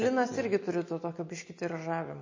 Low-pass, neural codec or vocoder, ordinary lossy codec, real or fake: 7.2 kHz; none; MP3, 32 kbps; real